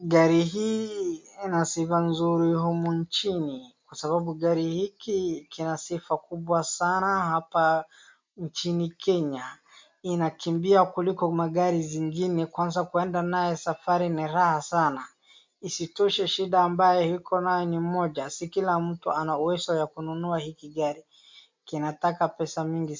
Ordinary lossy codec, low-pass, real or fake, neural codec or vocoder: MP3, 64 kbps; 7.2 kHz; real; none